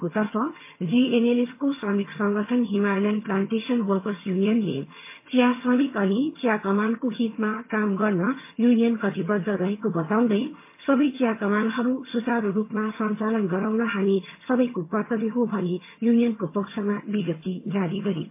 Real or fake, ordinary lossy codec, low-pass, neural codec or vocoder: fake; MP3, 24 kbps; 3.6 kHz; vocoder, 22.05 kHz, 80 mel bands, HiFi-GAN